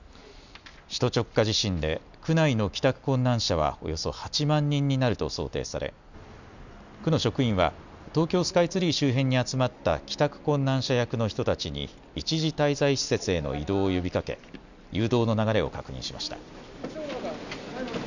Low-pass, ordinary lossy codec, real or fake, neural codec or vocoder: 7.2 kHz; none; real; none